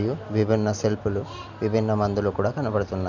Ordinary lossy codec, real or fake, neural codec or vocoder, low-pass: none; real; none; 7.2 kHz